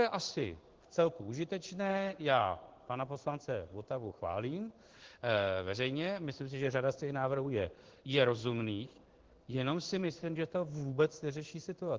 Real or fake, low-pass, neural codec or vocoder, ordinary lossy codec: fake; 7.2 kHz; codec, 16 kHz in and 24 kHz out, 1 kbps, XY-Tokenizer; Opus, 16 kbps